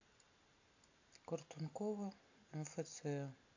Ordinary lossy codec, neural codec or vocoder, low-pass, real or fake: AAC, 48 kbps; none; 7.2 kHz; real